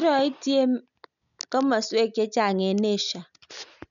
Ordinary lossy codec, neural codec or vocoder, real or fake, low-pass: none; none; real; 7.2 kHz